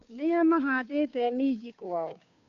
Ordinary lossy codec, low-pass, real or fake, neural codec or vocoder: none; 7.2 kHz; fake; codec, 16 kHz, 2 kbps, FunCodec, trained on Chinese and English, 25 frames a second